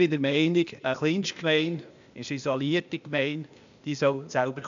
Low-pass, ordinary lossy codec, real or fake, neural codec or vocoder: 7.2 kHz; MP3, 64 kbps; fake; codec, 16 kHz, 0.8 kbps, ZipCodec